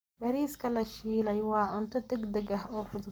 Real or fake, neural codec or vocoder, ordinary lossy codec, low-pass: fake; codec, 44.1 kHz, 7.8 kbps, Pupu-Codec; none; none